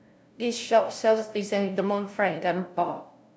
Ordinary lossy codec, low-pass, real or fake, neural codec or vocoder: none; none; fake; codec, 16 kHz, 0.5 kbps, FunCodec, trained on LibriTTS, 25 frames a second